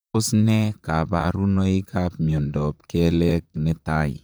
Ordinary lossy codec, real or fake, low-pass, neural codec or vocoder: none; fake; none; vocoder, 44.1 kHz, 128 mel bands, Pupu-Vocoder